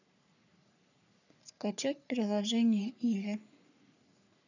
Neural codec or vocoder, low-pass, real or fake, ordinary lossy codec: codec, 44.1 kHz, 3.4 kbps, Pupu-Codec; 7.2 kHz; fake; none